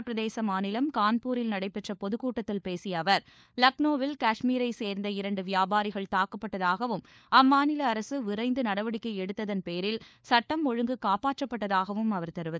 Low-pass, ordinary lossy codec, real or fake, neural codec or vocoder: none; none; fake; codec, 16 kHz, 4 kbps, FunCodec, trained on LibriTTS, 50 frames a second